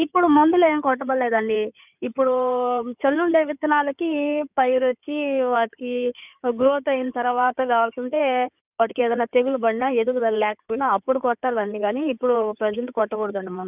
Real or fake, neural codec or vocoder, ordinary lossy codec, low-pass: fake; codec, 16 kHz in and 24 kHz out, 2.2 kbps, FireRedTTS-2 codec; none; 3.6 kHz